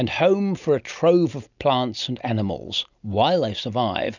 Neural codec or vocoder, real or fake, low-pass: none; real; 7.2 kHz